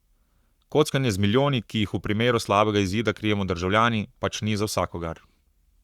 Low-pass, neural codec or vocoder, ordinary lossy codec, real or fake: 19.8 kHz; codec, 44.1 kHz, 7.8 kbps, Pupu-Codec; Opus, 64 kbps; fake